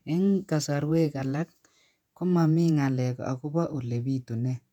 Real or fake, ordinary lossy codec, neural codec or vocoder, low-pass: fake; none; vocoder, 48 kHz, 128 mel bands, Vocos; 19.8 kHz